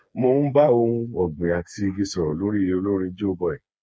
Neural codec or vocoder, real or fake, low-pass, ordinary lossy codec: codec, 16 kHz, 4 kbps, FreqCodec, smaller model; fake; none; none